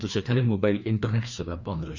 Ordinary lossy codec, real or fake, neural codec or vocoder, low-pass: none; fake; codec, 16 kHz, 2 kbps, FreqCodec, larger model; 7.2 kHz